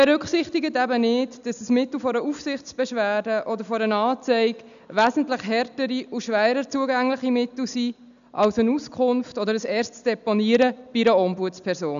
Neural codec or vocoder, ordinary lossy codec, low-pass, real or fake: none; none; 7.2 kHz; real